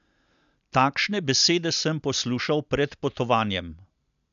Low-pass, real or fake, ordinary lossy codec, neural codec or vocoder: 7.2 kHz; real; none; none